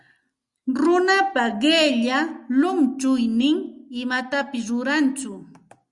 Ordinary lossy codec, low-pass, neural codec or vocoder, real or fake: Opus, 64 kbps; 10.8 kHz; none; real